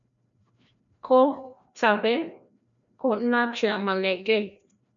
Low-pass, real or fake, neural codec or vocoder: 7.2 kHz; fake; codec, 16 kHz, 1 kbps, FreqCodec, larger model